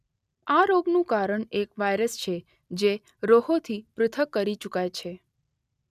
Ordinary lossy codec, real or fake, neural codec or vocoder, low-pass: none; real; none; 14.4 kHz